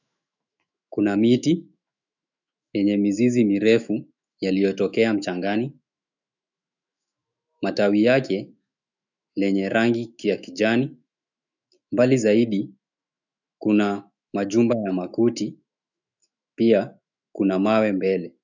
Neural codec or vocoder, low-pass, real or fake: autoencoder, 48 kHz, 128 numbers a frame, DAC-VAE, trained on Japanese speech; 7.2 kHz; fake